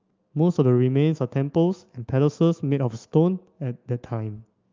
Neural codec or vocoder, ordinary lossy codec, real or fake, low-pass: autoencoder, 48 kHz, 128 numbers a frame, DAC-VAE, trained on Japanese speech; Opus, 24 kbps; fake; 7.2 kHz